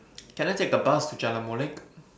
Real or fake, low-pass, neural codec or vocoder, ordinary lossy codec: real; none; none; none